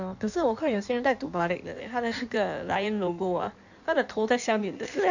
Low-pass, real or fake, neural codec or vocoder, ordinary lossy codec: 7.2 kHz; fake; codec, 16 kHz in and 24 kHz out, 1.1 kbps, FireRedTTS-2 codec; none